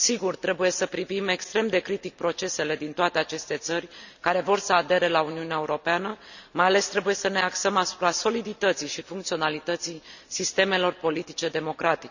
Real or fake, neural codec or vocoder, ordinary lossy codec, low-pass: real; none; none; 7.2 kHz